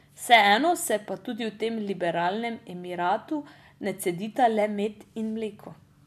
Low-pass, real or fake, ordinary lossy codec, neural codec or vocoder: 14.4 kHz; real; none; none